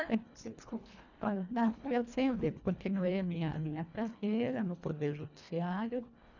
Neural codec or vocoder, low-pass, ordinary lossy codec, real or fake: codec, 24 kHz, 1.5 kbps, HILCodec; 7.2 kHz; none; fake